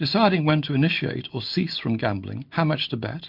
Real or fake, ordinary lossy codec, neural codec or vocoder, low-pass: real; MP3, 48 kbps; none; 5.4 kHz